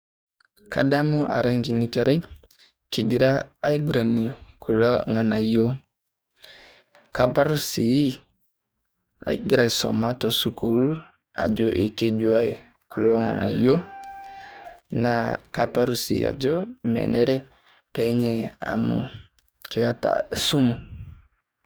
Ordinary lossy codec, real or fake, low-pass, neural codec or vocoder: none; fake; none; codec, 44.1 kHz, 2.6 kbps, DAC